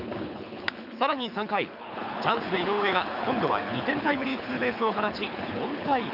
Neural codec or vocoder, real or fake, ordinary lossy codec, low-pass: codec, 24 kHz, 6 kbps, HILCodec; fake; none; 5.4 kHz